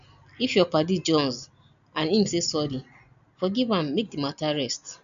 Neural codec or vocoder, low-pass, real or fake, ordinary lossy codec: none; 7.2 kHz; real; none